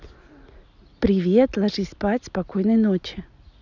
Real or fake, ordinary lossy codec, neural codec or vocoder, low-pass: real; none; none; 7.2 kHz